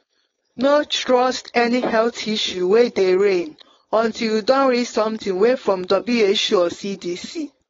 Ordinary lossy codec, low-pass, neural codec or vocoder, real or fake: AAC, 24 kbps; 7.2 kHz; codec, 16 kHz, 4.8 kbps, FACodec; fake